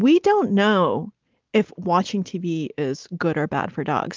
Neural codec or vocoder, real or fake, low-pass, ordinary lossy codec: none; real; 7.2 kHz; Opus, 24 kbps